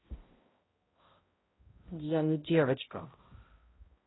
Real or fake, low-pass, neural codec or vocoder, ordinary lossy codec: fake; 7.2 kHz; codec, 16 kHz, 0.5 kbps, X-Codec, HuBERT features, trained on balanced general audio; AAC, 16 kbps